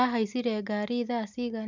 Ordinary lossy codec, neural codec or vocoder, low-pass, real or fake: none; none; 7.2 kHz; real